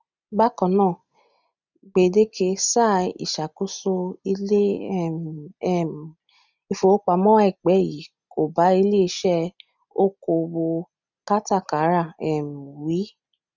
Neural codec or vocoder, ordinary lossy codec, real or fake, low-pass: none; none; real; 7.2 kHz